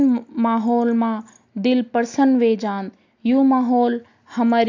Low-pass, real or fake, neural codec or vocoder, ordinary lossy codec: 7.2 kHz; real; none; AAC, 48 kbps